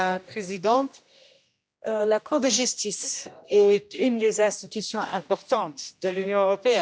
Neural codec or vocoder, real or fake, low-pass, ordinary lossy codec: codec, 16 kHz, 1 kbps, X-Codec, HuBERT features, trained on general audio; fake; none; none